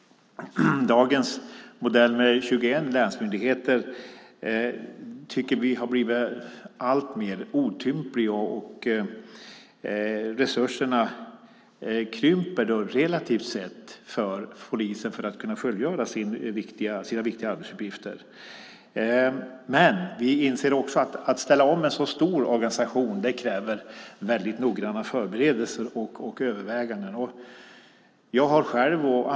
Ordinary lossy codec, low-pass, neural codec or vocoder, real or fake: none; none; none; real